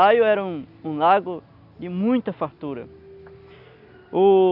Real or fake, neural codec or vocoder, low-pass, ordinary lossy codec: real; none; 5.4 kHz; none